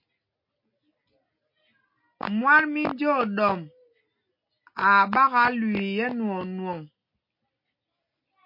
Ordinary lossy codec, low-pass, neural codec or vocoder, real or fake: MP3, 32 kbps; 5.4 kHz; none; real